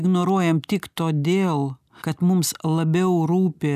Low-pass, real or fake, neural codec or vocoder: 14.4 kHz; real; none